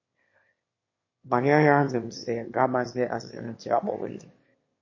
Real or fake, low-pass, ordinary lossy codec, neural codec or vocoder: fake; 7.2 kHz; MP3, 32 kbps; autoencoder, 22.05 kHz, a latent of 192 numbers a frame, VITS, trained on one speaker